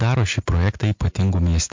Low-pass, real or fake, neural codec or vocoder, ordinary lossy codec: 7.2 kHz; real; none; MP3, 48 kbps